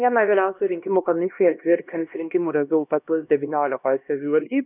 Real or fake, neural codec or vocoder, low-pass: fake; codec, 16 kHz, 1 kbps, X-Codec, HuBERT features, trained on LibriSpeech; 3.6 kHz